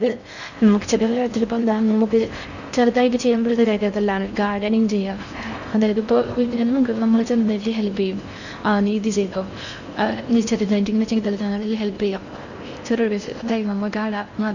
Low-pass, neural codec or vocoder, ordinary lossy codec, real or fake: 7.2 kHz; codec, 16 kHz in and 24 kHz out, 0.6 kbps, FocalCodec, streaming, 4096 codes; none; fake